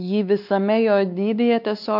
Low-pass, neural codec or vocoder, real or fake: 5.4 kHz; codec, 16 kHz, 4 kbps, X-Codec, WavLM features, trained on Multilingual LibriSpeech; fake